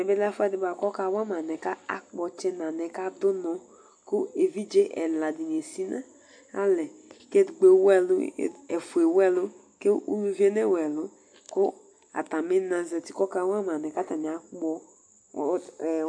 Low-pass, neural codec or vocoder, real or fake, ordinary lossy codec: 9.9 kHz; none; real; AAC, 64 kbps